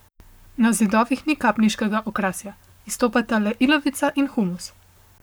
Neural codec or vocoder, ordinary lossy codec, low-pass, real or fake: codec, 44.1 kHz, 7.8 kbps, Pupu-Codec; none; none; fake